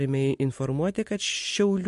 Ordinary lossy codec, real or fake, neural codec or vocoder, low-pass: MP3, 48 kbps; real; none; 14.4 kHz